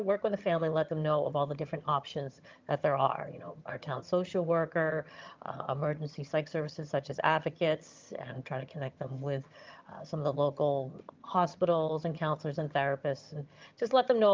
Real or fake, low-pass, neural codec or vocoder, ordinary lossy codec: fake; 7.2 kHz; vocoder, 22.05 kHz, 80 mel bands, HiFi-GAN; Opus, 24 kbps